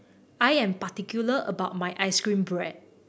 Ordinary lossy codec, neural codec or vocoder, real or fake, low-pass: none; none; real; none